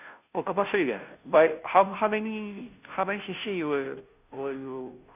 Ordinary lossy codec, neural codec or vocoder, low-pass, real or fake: none; codec, 16 kHz, 0.5 kbps, FunCodec, trained on Chinese and English, 25 frames a second; 3.6 kHz; fake